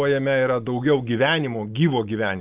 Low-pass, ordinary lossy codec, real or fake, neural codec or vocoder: 3.6 kHz; Opus, 32 kbps; real; none